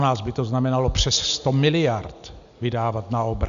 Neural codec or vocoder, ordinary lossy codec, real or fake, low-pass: none; AAC, 96 kbps; real; 7.2 kHz